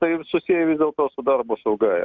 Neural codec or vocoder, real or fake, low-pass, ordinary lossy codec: none; real; 7.2 kHz; Opus, 64 kbps